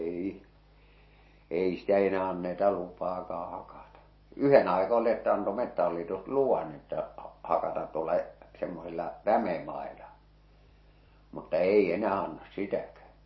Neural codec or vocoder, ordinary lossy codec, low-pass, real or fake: none; MP3, 24 kbps; 7.2 kHz; real